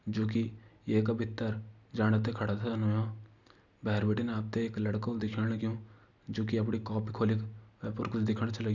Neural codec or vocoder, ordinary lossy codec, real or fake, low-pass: none; none; real; 7.2 kHz